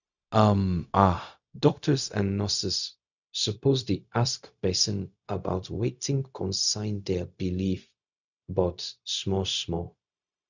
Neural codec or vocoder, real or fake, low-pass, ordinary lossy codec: codec, 16 kHz, 0.4 kbps, LongCat-Audio-Codec; fake; 7.2 kHz; none